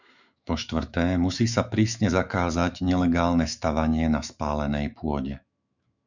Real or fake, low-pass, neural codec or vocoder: fake; 7.2 kHz; autoencoder, 48 kHz, 128 numbers a frame, DAC-VAE, trained on Japanese speech